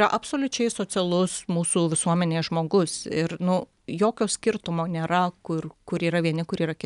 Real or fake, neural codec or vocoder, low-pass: real; none; 10.8 kHz